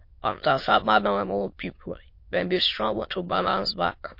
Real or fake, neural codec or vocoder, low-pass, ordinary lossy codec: fake; autoencoder, 22.05 kHz, a latent of 192 numbers a frame, VITS, trained on many speakers; 5.4 kHz; MP3, 32 kbps